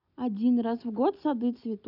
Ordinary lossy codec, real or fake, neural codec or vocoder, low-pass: none; real; none; 5.4 kHz